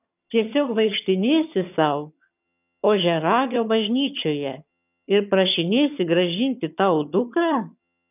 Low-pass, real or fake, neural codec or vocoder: 3.6 kHz; fake; vocoder, 22.05 kHz, 80 mel bands, HiFi-GAN